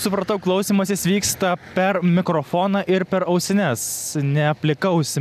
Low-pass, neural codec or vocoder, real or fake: 14.4 kHz; none; real